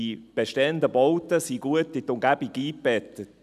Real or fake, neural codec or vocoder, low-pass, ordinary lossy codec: real; none; 14.4 kHz; none